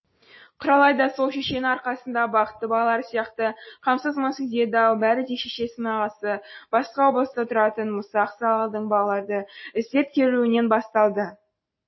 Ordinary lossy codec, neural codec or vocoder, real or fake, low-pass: MP3, 24 kbps; none; real; 7.2 kHz